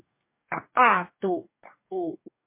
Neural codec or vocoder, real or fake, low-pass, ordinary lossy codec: codec, 44.1 kHz, 2.6 kbps, DAC; fake; 3.6 kHz; MP3, 24 kbps